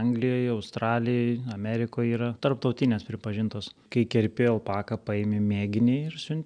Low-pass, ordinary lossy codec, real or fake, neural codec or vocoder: 9.9 kHz; MP3, 96 kbps; real; none